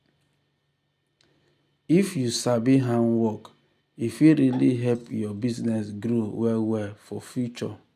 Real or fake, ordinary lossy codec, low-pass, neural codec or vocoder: real; none; 14.4 kHz; none